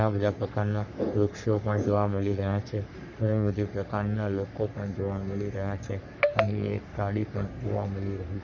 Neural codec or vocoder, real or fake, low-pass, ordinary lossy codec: codec, 44.1 kHz, 3.4 kbps, Pupu-Codec; fake; 7.2 kHz; none